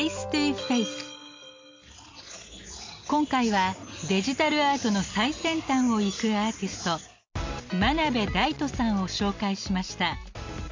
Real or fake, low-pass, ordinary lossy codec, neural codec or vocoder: real; 7.2 kHz; MP3, 48 kbps; none